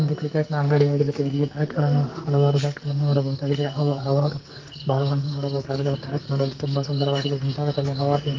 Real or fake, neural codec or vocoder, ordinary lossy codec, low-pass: fake; codec, 44.1 kHz, 2.6 kbps, SNAC; Opus, 32 kbps; 7.2 kHz